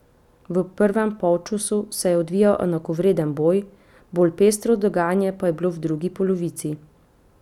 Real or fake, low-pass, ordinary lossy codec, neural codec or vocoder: real; 19.8 kHz; none; none